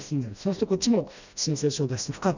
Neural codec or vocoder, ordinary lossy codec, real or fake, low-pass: codec, 16 kHz, 1 kbps, FreqCodec, smaller model; none; fake; 7.2 kHz